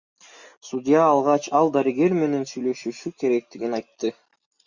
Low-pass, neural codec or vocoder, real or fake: 7.2 kHz; none; real